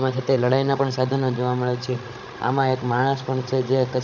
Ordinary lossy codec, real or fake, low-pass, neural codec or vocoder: none; fake; 7.2 kHz; codec, 16 kHz, 16 kbps, FreqCodec, larger model